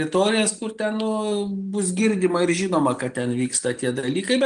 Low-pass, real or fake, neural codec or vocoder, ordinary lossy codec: 9.9 kHz; real; none; Opus, 24 kbps